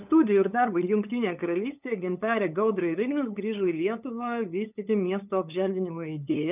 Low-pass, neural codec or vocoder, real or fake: 3.6 kHz; codec, 16 kHz, 8 kbps, FunCodec, trained on LibriTTS, 25 frames a second; fake